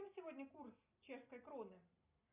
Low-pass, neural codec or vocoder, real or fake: 3.6 kHz; none; real